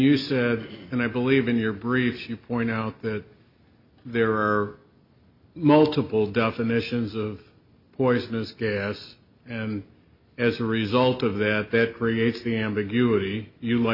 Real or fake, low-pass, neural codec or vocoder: real; 5.4 kHz; none